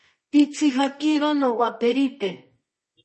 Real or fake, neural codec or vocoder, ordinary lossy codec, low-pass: fake; codec, 24 kHz, 0.9 kbps, WavTokenizer, medium music audio release; MP3, 32 kbps; 10.8 kHz